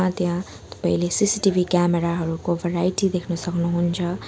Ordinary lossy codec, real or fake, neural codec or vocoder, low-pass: none; real; none; none